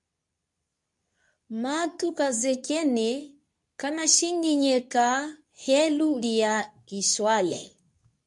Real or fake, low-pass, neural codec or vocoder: fake; 10.8 kHz; codec, 24 kHz, 0.9 kbps, WavTokenizer, medium speech release version 2